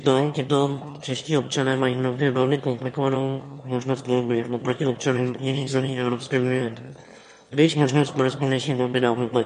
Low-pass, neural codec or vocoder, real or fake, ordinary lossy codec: 9.9 kHz; autoencoder, 22.05 kHz, a latent of 192 numbers a frame, VITS, trained on one speaker; fake; MP3, 48 kbps